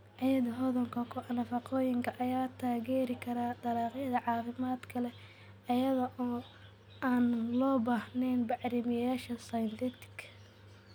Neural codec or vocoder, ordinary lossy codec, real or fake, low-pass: none; none; real; none